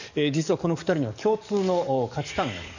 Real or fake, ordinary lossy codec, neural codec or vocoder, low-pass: fake; none; codec, 44.1 kHz, 7.8 kbps, DAC; 7.2 kHz